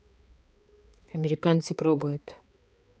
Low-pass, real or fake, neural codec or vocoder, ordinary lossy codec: none; fake; codec, 16 kHz, 2 kbps, X-Codec, HuBERT features, trained on balanced general audio; none